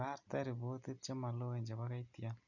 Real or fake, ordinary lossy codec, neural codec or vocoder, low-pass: real; none; none; 7.2 kHz